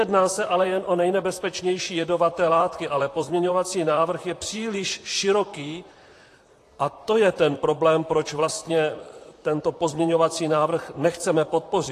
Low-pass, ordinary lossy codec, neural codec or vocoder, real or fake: 14.4 kHz; AAC, 48 kbps; vocoder, 44.1 kHz, 128 mel bands, Pupu-Vocoder; fake